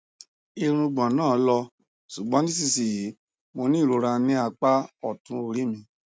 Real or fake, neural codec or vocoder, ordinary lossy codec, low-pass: real; none; none; none